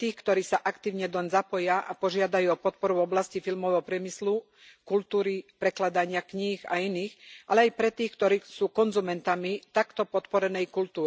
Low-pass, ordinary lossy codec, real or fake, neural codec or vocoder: none; none; real; none